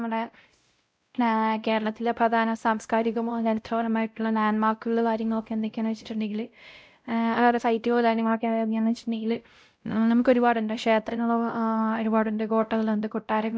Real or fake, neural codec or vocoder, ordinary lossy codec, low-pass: fake; codec, 16 kHz, 0.5 kbps, X-Codec, WavLM features, trained on Multilingual LibriSpeech; none; none